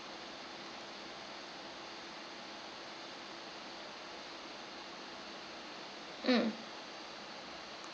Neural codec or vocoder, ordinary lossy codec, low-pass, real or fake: none; none; none; real